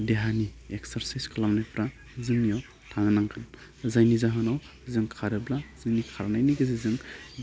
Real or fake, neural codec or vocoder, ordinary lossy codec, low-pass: real; none; none; none